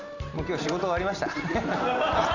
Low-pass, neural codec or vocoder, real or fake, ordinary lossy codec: 7.2 kHz; none; real; none